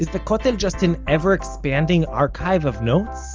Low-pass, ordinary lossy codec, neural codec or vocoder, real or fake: 7.2 kHz; Opus, 24 kbps; none; real